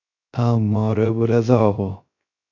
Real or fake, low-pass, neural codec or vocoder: fake; 7.2 kHz; codec, 16 kHz, 0.3 kbps, FocalCodec